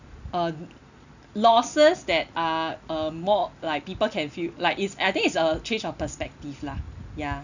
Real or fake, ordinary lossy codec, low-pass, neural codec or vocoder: real; none; 7.2 kHz; none